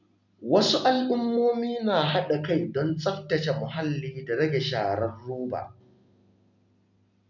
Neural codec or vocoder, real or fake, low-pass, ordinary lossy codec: none; real; 7.2 kHz; none